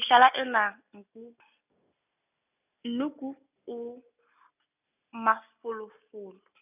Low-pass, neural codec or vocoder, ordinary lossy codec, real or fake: 3.6 kHz; none; none; real